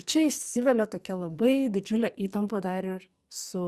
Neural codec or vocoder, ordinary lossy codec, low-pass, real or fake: codec, 44.1 kHz, 2.6 kbps, SNAC; Opus, 64 kbps; 14.4 kHz; fake